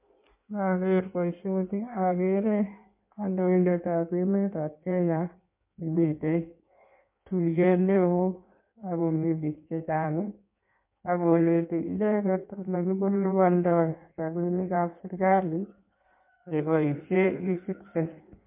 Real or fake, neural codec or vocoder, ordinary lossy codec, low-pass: fake; codec, 16 kHz in and 24 kHz out, 1.1 kbps, FireRedTTS-2 codec; MP3, 32 kbps; 3.6 kHz